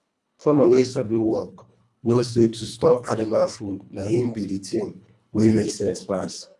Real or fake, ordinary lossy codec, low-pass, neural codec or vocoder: fake; none; none; codec, 24 kHz, 1.5 kbps, HILCodec